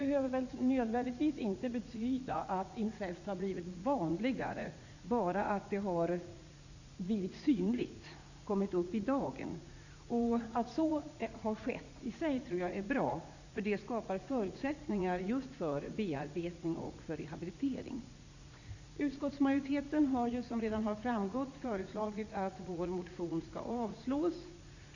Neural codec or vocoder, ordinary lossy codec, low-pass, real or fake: vocoder, 44.1 kHz, 80 mel bands, Vocos; none; 7.2 kHz; fake